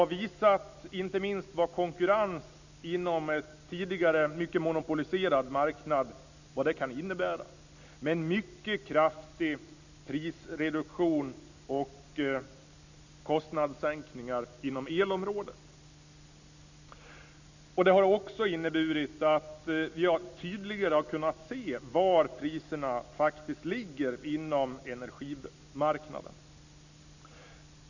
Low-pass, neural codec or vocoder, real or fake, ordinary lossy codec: 7.2 kHz; none; real; none